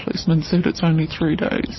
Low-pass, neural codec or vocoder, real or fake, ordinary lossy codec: 7.2 kHz; none; real; MP3, 24 kbps